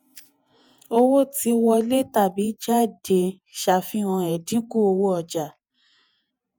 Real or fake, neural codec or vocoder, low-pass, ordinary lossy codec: fake; vocoder, 48 kHz, 128 mel bands, Vocos; none; none